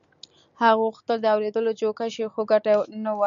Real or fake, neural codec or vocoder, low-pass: real; none; 7.2 kHz